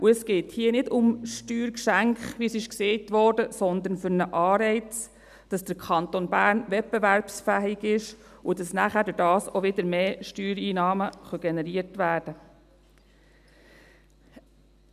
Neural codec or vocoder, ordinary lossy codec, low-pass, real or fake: none; none; 14.4 kHz; real